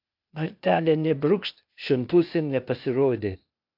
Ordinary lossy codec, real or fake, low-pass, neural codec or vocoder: AAC, 48 kbps; fake; 5.4 kHz; codec, 16 kHz, 0.8 kbps, ZipCodec